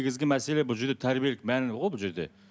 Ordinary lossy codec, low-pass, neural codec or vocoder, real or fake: none; none; none; real